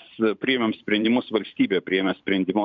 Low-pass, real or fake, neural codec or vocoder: 7.2 kHz; fake; vocoder, 44.1 kHz, 128 mel bands every 512 samples, BigVGAN v2